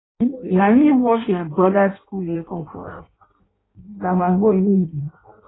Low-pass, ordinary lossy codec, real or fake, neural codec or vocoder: 7.2 kHz; AAC, 16 kbps; fake; codec, 16 kHz in and 24 kHz out, 0.6 kbps, FireRedTTS-2 codec